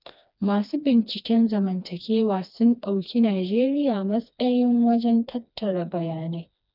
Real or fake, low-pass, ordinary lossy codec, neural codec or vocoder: fake; 5.4 kHz; none; codec, 16 kHz, 2 kbps, FreqCodec, smaller model